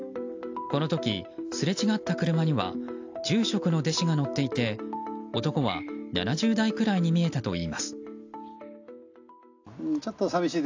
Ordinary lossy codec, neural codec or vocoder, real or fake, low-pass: AAC, 48 kbps; none; real; 7.2 kHz